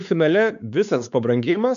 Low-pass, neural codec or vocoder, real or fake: 7.2 kHz; codec, 16 kHz, 4 kbps, X-Codec, WavLM features, trained on Multilingual LibriSpeech; fake